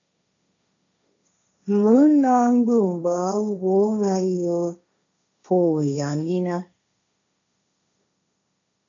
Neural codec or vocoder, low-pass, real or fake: codec, 16 kHz, 1.1 kbps, Voila-Tokenizer; 7.2 kHz; fake